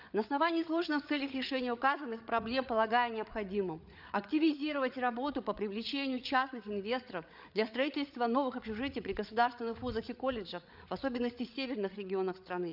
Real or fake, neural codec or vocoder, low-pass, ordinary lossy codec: fake; codec, 16 kHz, 8 kbps, FreqCodec, larger model; 5.4 kHz; none